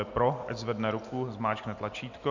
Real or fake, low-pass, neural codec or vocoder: real; 7.2 kHz; none